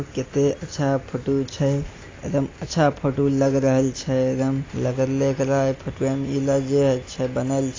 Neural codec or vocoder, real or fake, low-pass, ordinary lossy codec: none; real; 7.2 kHz; AAC, 32 kbps